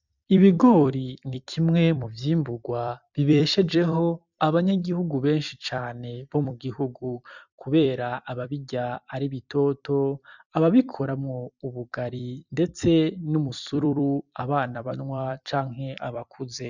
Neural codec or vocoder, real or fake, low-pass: vocoder, 44.1 kHz, 128 mel bands every 256 samples, BigVGAN v2; fake; 7.2 kHz